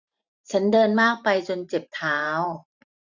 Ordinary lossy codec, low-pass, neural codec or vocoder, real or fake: none; 7.2 kHz; vocoder, 44.1 kHz, 128 mel bands every 512 samples, BigVGAN v2; fake